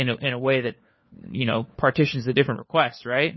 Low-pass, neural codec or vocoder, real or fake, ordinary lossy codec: 7.2 kHz; codec, 16 kHz, 4 kbps, FunCodec, trained on LibriTTS, 50 frames a second; fake; MP3, 24 kbps